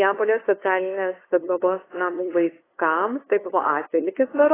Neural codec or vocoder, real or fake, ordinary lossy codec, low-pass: codec, 16 kHz, 2 kbps, FunCodec, trained on LibriTTS, 25 frames a second; fake; AAC, 16 kbps; 3.6 kHz